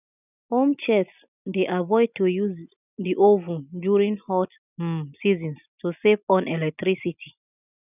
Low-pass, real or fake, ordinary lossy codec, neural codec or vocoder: 3.6 kHz; real; none; none